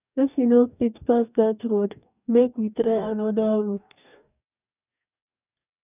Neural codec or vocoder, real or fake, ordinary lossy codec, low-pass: codec, 44.1 kHz, 2.6 kbps, DAC; fake; none; 3.6 kHz